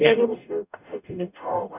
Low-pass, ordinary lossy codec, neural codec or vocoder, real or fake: 3.6 kHz; none; codec, 44.1 kHz, 0.9 kbps, DAC; fake